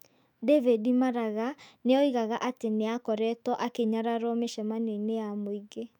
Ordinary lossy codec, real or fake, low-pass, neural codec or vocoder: none; fake; 19.8 kHz; autoencoder, 48 kHz, 128 numbers a frame, DAC-VAE, trained on Japanese speech